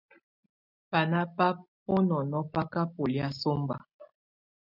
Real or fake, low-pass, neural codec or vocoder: real; 5.4 kHz; none